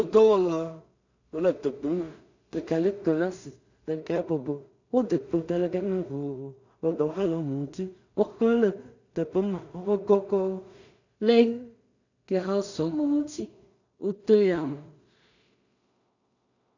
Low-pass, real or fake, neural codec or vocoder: 7.2 kHz; fake; codec, 16 kHz in and 24 kHz out, 0.4 kbps, LongCat-Audio-Codec, two codebook decoder